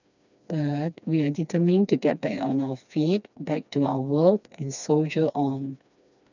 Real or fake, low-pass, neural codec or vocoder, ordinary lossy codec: fake; 7.2 kHz; codec, 16 kHz, 2 kbps, FreqCodec, smaller model; none